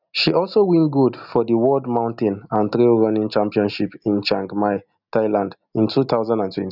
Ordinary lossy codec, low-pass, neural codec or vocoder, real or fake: none; 5.4 kHz; none; real